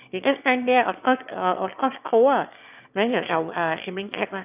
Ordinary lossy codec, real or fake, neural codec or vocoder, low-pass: AAC, 32 kbps; fake; autoencoder, 22.05 kHz, a latent of 192 numbers a frame, VITS, trained on one speaker; 3.6 kHz